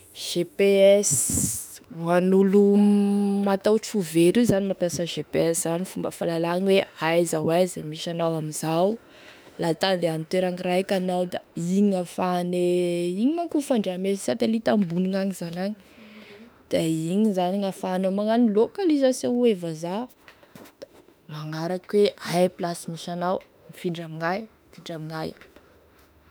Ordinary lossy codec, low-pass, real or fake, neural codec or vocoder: none; none; fake; autoencoder, 48 kHz, 32 numbers a frame, DAC-VAE, trained on Japanese speech